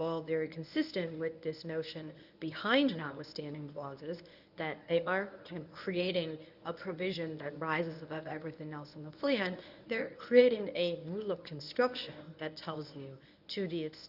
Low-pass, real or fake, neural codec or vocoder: 5.4 kHz; fake; codec, 24 kHz, 0.9 kbps, WavTokenizer, medium speech release version 1